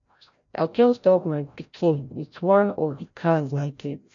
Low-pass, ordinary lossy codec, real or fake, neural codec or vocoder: 7.2 kHz; none; fake; codec, 16 kHz, 0.5 kbps, FreqCodec, larger model